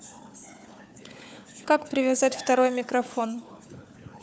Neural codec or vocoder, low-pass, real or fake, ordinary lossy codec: codec, 16 kHz, 4 kbps, FunCodec, trained on Chinese and English, 50 frames a second; none; fake; none